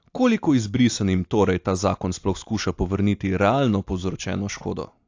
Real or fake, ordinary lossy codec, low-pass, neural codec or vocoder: real; AAC, 48 kbps; 7.2 kHz; none